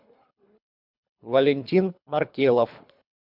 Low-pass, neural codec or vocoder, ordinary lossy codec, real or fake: 5.4 kHz; codec, 24 kHz, 3 kbps, HILCodec; MP3, 48 kbps; fake